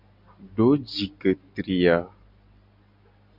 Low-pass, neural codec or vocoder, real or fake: 5.4 kHz; none; real